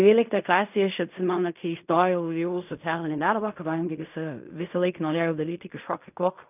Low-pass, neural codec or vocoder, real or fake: 3.6 kHz; codec, 16 kHz in and 24 kHz out, 0.4 kbps, LongCat-Audio-Codec, fine tuned four codebook decoder; fake